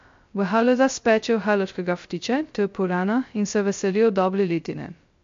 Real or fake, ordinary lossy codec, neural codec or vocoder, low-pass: fake; MP3, 48 kbps; codec, 16 kHz, 0.2 kbps, FocalCodec; 7.2 kHz